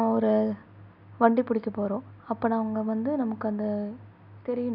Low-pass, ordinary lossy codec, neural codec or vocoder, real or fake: 5.4 kHz; none; none; real